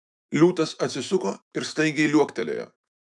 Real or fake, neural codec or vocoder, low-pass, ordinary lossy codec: fake; autoencoder, 48 kHz, 128 numbers a frame, DAC-VAE, trained on Japanese speech; 10.8 kHz; MP3, 96 kbps